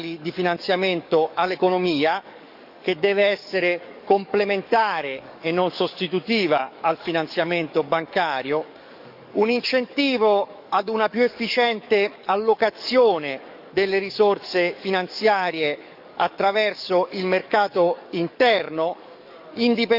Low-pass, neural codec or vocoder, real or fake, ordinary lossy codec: 5.4 kHz; codec, 44.1 kHz, 7.8 kbps, DAC; fake; none